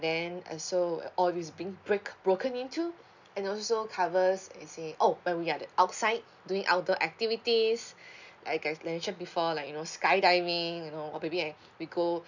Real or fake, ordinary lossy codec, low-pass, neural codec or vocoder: real; none; 7.2 kHz; none